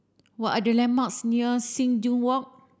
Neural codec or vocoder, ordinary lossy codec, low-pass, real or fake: none; none; none; real